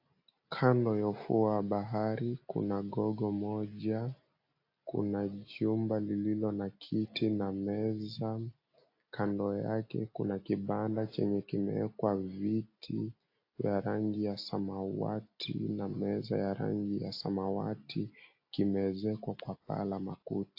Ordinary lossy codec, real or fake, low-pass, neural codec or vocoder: AAC, 32 kbps; real; 5.4 kHz; none